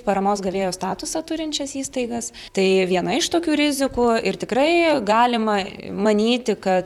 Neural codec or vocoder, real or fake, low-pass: vocoder, 44.1 kHz, 128 mel bands, Pupu-Vocoder; fake; 19.8 kHz